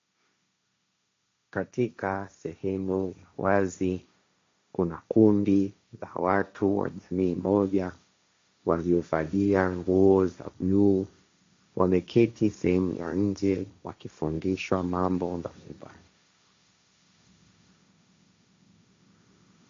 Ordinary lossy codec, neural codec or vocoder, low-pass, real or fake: MP3, 48 kbps; codec, 16 kHz, 1.1 kbps, Voila-Tokenizer; 7.2 kHz; fake